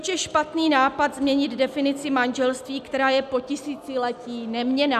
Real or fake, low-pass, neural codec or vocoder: real; 14.4 kHz; none